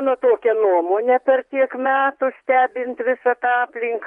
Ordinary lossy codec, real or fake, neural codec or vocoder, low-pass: MP3, 64 kbps; fake; vocoder, 24 kHz, 100 mel bands, Vocos; 10.8 kHz